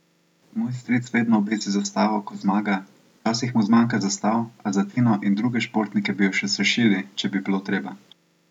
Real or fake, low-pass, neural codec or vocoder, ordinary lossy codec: real; 19.8 kHz; none; none